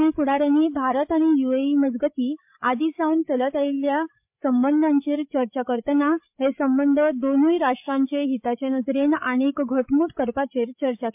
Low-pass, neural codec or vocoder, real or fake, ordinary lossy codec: 3.6 kHz; codec, 16 kHz, 8 kbps, FreqCodec, larger model; fake; MP3, 32 kbps